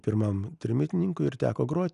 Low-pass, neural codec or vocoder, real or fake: 10.8 kHz; none; real